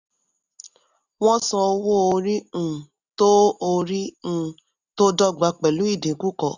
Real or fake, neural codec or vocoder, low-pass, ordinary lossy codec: real; none; 7.2 kHz; none